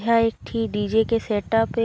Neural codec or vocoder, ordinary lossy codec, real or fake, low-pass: none; none; real; none